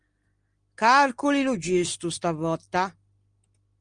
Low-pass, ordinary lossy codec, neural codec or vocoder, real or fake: 9.9 kHz; Opus, 24 kbps; none; real